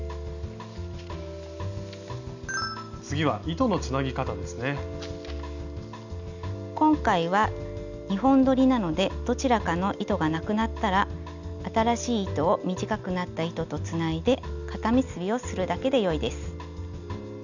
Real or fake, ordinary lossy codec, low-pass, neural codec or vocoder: real; none; 7.2 kHz; none